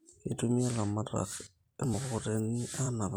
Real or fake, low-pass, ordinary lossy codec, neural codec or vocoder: fake; none; none; vocoder, 44.1 kHz, 128 mel bands every 256 samples, BigVGAN v2